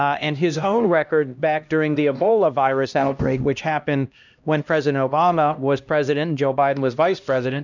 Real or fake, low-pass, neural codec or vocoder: fake; 7.2 kHz; codec, 16 kHz, 1 kbps, X-Codec, HuBERT features, trained on LibriSpeech